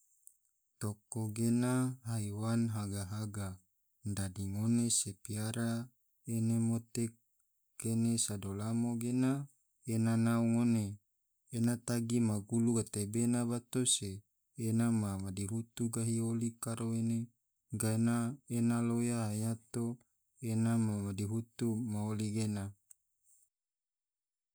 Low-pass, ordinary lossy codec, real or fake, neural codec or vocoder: none; none; real; none